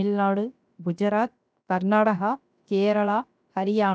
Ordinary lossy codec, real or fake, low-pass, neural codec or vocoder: none; fake; none; codec, 16 kHz, 0.7 kbps, FocalCodec